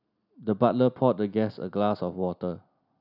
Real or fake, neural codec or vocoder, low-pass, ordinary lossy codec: real; none; 5.4 kHz; none